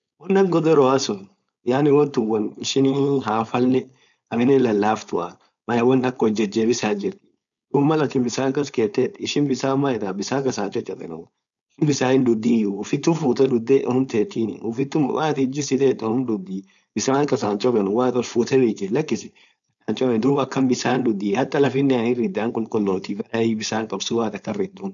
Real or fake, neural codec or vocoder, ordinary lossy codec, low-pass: fake; codec, 16 kHz, 4.8 kbps, FACodec; none; 7.2 kHz